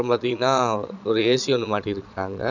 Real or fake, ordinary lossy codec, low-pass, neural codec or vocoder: fake; none; 7.2 kHz; vocoder, 22.05 kHz, 80 mel bands, Vocos